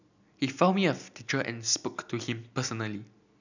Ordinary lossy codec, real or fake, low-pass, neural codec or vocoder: none; real; 7.2 kHz; none